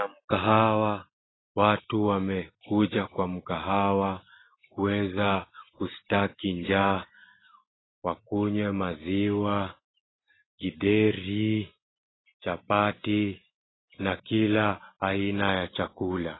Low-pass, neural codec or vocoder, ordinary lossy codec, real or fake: 7.2 kHz; none; AAC, 16 kbps; real